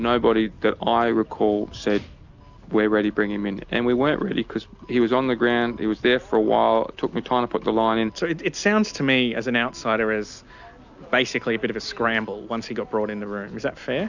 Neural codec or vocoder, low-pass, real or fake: none; 7.2 kHz; real